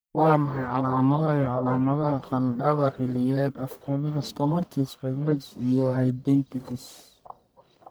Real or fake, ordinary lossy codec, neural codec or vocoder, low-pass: fake; none; codec, 44.1 kHz, 1.7 kbps, Pupu-Codec; none